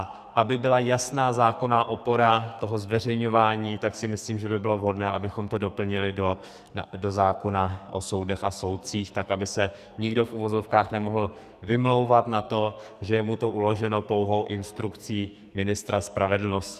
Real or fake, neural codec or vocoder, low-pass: fake; codec, 44.1 kHz, 2.6 kbps, SNAC; 14.4 kHz